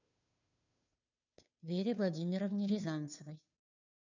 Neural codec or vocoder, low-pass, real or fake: codec, 16 kHz, 2 kbps, FunCodec, trained on Chinese and English, 25 frames a second; 7.2 kHz; fake